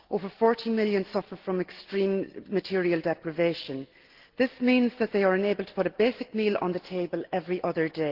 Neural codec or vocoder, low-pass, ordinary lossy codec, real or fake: none; 5.4 kHz; Opus, 16 kbps; real